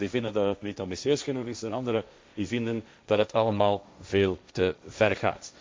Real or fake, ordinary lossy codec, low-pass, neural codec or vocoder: fake; none; none; codec, 16 kHz, 1.1 kbps, Voila-Tokenizer